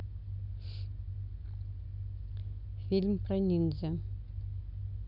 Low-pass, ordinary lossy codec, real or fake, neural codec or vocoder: 5.4 kHz; none; real; none